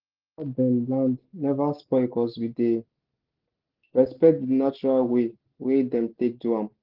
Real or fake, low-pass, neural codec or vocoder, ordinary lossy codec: real; 5.4 kHz; none; Opus, 16 kbps